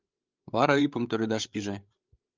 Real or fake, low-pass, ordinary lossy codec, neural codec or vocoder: fake; 7.2 kHz; Opus, 32 kbps; codec, 16 kHz, 8 kbps, FreqCodec, larger model